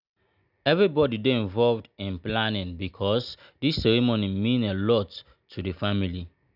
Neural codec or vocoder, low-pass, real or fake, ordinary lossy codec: none; 5.4 kHz; real; none